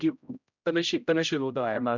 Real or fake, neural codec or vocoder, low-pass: fake; codec, 16 kHz, 0.5 kbps, X-Codec, HuBERT features, trained on general audio; 7.2 kHz